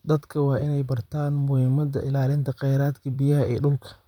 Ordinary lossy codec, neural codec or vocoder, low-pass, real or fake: none; vocoder, 44.1 kHz, 128 mel bands, Pupu-Vocoder; 19.8 kHz; fake